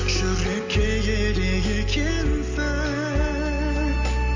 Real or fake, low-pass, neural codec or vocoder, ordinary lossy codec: real; 7.2 kHz; none; MP3, 48 kbps